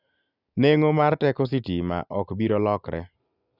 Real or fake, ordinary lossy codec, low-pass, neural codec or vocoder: real; none; 5.4 kHz; none